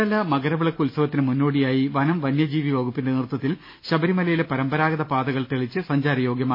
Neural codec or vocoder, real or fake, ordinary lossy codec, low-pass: none; real; MP3, 24 kbps; 5.4 kHz